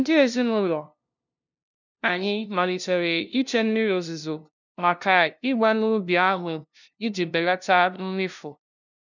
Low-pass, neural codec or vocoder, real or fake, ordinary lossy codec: 7.2 kHz; codec, 16 kHz, 0.5 kbps, FunCodec, trained on LibriTTS, 25 frames a second; fake; none